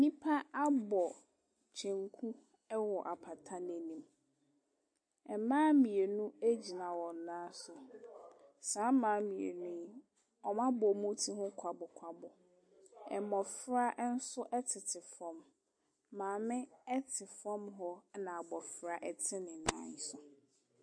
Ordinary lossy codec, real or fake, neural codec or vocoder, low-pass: MP3, 48 kbps; real; none; 9.9 kHz